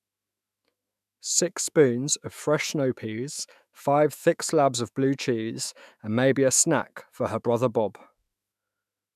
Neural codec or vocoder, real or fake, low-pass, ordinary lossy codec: autoencoder, 48 kHz, 128 numbers a frame, DAC-VAE, trained on Japanese speech; fake; 14.4 kHz; none